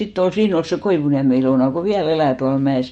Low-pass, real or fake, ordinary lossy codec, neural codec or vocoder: 19.8 kHz; fake; MP3, 48 kbps; autoencoder, 48 kHz, 128 numbers a frame, DAC-VAE, trained on Japanese speech